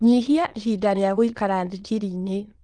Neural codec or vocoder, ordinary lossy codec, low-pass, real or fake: autoencoder, 22.05 kHz, a latent of 192 numbers a frame, VITS, trained on many speakers; Opus, 24 kbps; 9.9 kHz; fake